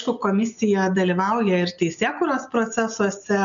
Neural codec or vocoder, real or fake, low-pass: none; real; 7.2 kHz